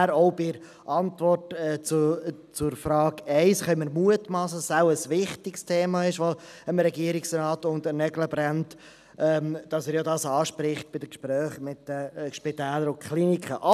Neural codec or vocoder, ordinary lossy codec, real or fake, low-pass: none; none; real; 14.4 kHz